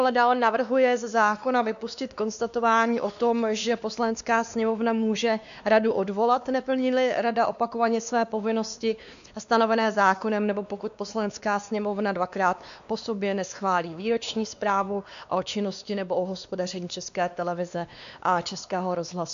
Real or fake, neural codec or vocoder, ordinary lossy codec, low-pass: fake; codec, 16 kHz, 2 kbps, X-Codec, WavLM features, trained on Multilingual LibriSpeech; AAC, 96 kbps; 7.2 kHz